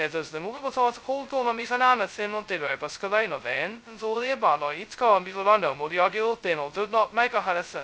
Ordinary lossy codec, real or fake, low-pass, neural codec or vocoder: none; fake; none; codec, 16 kHz, 0.2 kbps, FocalCodec